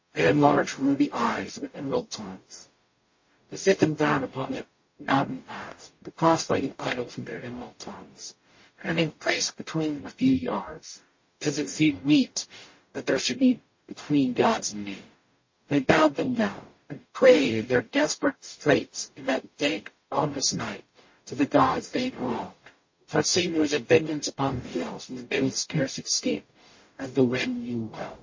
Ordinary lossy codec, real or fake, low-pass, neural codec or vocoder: MP3, 32 kbps; fake; 7.2 kHz; codec, 44.1 kHz, 0.9 kbps, DAC